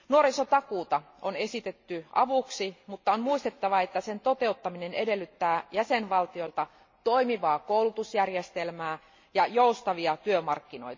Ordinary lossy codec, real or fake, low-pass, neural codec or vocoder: MP3, 32 kbps; real; 7.2 kHz; none